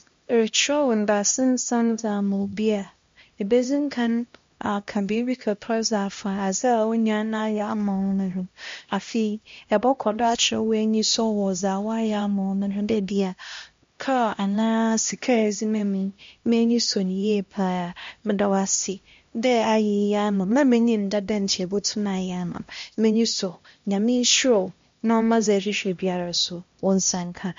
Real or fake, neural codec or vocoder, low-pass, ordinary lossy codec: fake; codec, 16 kHz, 1 kbps, X-Codec, HuBERT features, trained on LibriSpeech; 7.2 kHz; MP3, 48 kbps